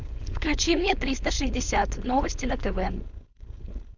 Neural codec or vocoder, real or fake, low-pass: codec, 16 kHz, 4.8 kbps, FACodec; fake; 7.2 kHz